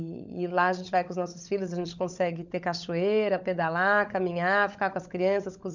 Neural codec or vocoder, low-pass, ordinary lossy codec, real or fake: codec, 16 kHz, 16 kbps, FunCodec, trained on LibriTTS, 50 frames a second; 7.2 kHz; none; fake